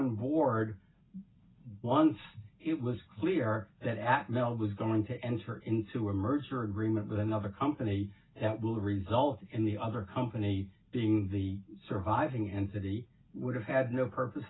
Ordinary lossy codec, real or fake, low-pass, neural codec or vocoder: AAC, 16 kbps; real; 7.2 kHz; none